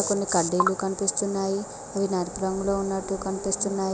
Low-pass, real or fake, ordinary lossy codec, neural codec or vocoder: none; real; none; none